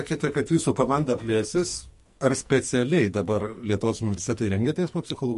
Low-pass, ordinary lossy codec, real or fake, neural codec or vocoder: 14.4 kHz; MP3, 48 kbps; fake; codec, 44.1 kHz, 2.6 kbps, SNAC